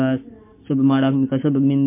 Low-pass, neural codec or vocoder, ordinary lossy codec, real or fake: 3.6 kHz; codec, 44.1 kHz, 7.8 kbps, Pupu-Codec; MP3, 24 kbps; fake